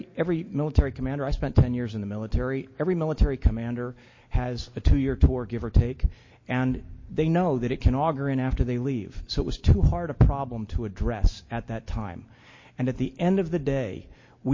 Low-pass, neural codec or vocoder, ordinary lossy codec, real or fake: 7.2 kHz; none; MP3, 32 kbps; real